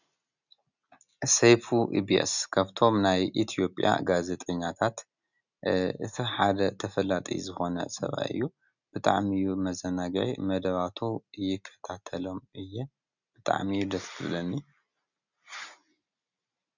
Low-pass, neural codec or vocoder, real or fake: 7.2 kHz; none; real